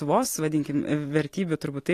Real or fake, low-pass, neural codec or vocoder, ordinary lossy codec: real; 14.4 kHz; none; AAC, 48 kbps